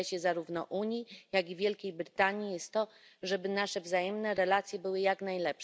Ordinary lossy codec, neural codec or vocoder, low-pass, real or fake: none; none; none; real